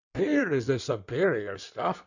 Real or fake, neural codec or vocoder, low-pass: fake; codec, 16 kHz in and 24 kHz out, 1.1 kbps, FireRedTTS-2 codec; 7.2 kHz